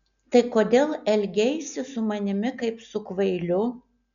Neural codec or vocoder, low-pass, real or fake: none; 7.2 kHz; real